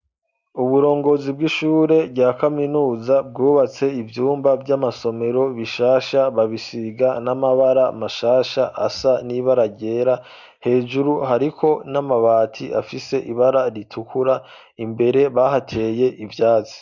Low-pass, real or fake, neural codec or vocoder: 7.2 kHz; real; none